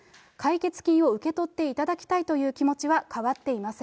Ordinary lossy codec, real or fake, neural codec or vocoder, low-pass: none; real; none; none